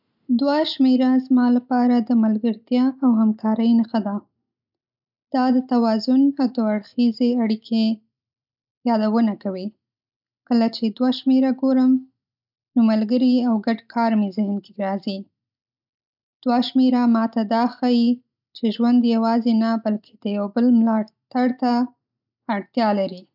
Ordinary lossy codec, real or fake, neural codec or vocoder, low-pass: none; real; none; 5.4 kHz